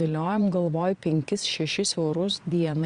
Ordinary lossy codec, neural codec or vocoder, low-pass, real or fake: Opus, 64 kbps; vocoder, 22.05 kHz, 80 mel bands, Vocos; 9.9 kHz; fake